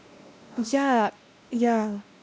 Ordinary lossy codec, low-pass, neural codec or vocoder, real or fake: none; none; codec, 16 kHz, 1 kbps, X-Codec, WavLM features, trained on Multilingual LibriSpeech; fake